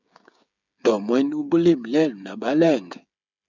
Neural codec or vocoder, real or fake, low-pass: codec, 16 kHz, 16 kbps, FreqCodec, smaller model; fake; 7.2 kHz